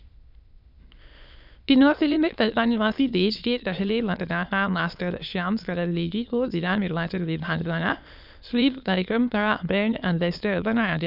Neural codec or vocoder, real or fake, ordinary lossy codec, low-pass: autoencoder, 22.05 kHz, a latent of 192 numbers a frame, VITS, trained on many speakers; fake; none; 5.4 kHz